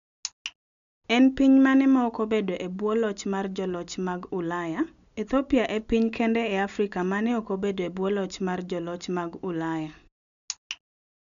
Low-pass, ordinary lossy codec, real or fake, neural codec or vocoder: 7.2 kHz; none; real; none